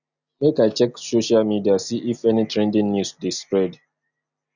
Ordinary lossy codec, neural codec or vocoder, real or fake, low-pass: none; none; real; 7.2 kHz